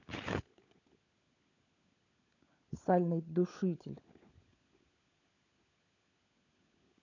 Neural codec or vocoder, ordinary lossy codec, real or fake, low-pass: codec, 16 kHz, 16 kbps, FunCodec, trained on LibriTTS, 50 frames a second; none; fake; 7.2 kHz